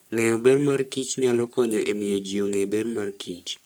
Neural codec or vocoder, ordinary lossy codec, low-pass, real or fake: codec, 44.1 kHz, 3.4 kbps, Pupu-Codec; none; none; fake